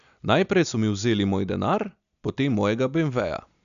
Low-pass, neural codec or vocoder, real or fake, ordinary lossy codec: 7.2 kHz; none; real; none